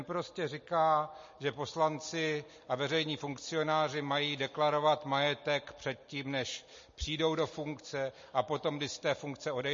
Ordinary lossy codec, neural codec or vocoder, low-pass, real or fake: MP3, 32 kbps; none; 7.2 kHz; real